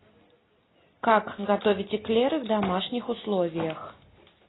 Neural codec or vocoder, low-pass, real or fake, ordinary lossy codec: none; 7.2 kHz; real; AAC, 16 kbps